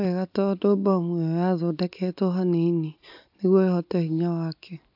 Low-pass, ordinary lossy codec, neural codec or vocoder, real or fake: 5.4 kHz; AAC, 48 kbps; none; real